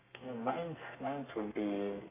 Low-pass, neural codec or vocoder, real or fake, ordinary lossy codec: 3.6 kHz; codec, 32 kHz, 1.9 kbps, SNAC; fake; AAC, 16 kbps